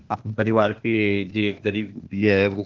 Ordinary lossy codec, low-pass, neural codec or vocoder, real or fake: Opus, 16 kbps; 7.2 kHz; codec, 16 kHz, 0.8 kbps, ZipCodec; fake